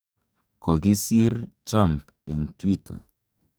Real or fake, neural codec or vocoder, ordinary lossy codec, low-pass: fake; codec, 44.1 kHz, 2.6 kbps, DAC; none; none